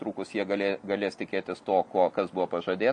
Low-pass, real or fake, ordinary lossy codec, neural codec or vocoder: 10.8 kHz; real; MP3, 48 kbps; none